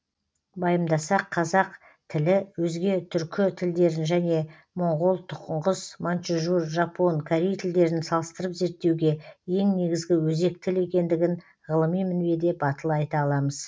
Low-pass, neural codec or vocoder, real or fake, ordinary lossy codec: none; none; real; none